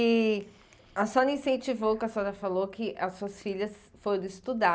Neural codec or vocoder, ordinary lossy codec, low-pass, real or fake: none; none; none; real